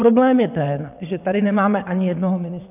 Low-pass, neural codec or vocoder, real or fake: 3.6 kHz; vocoder, 22.05 kHz, 80 mel bands, WaveNeXt; fake